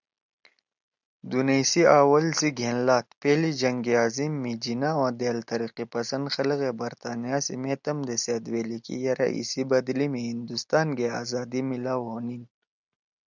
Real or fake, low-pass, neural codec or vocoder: real; 7.2 kHz; none